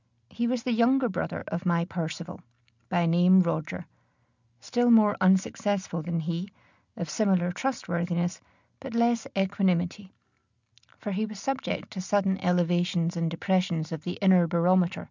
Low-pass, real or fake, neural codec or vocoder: 7.2 kHz; real; none